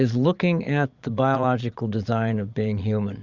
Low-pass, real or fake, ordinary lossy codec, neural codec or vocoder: 7.2 kHz; fake; Opus, 64 kbps; vocoder, 22.05 kHz, 80 mel bands, Vocos